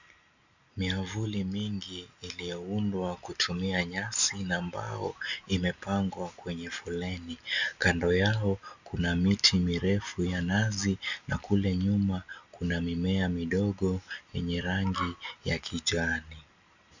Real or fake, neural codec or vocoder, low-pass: real; none; 7.2 kHz